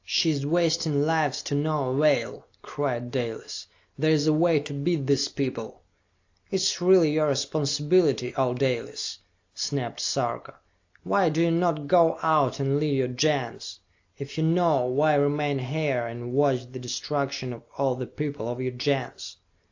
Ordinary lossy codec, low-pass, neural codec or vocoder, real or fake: AAC, 48 kbps; 7.2 kHz; none; real